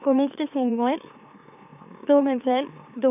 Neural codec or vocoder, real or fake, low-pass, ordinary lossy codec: autoencoder, 44.1 kHz, a latent of 192 numbers a frame, MeloTTS; fake; 3.6 kHz; none